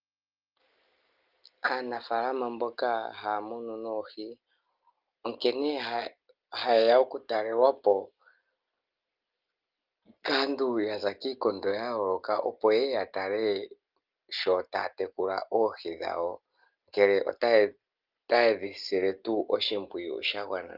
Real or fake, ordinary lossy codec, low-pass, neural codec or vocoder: real; Opus, 32 kbps; 5.4 kHz; none